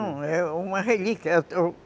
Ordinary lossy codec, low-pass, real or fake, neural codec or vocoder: none; none; real; none